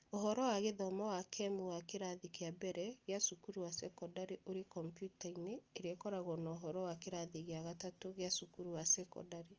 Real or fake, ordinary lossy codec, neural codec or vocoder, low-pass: real; Opus, 24 kbps; none; 7.2 kHz